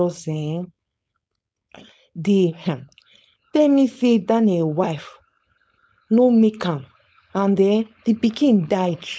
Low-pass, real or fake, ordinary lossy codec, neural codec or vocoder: none; fake; none; codec, 16 kHz, 4.8 kbps, FACodec